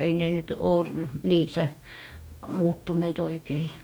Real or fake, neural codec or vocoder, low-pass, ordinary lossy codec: fake; codec, 44.1 kHz, 2.6 kbps, DAC; none; none